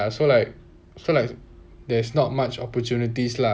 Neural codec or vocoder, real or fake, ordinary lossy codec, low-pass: none; real; none; none